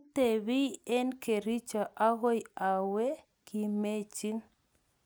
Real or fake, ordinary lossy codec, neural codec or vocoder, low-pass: real; none; none; none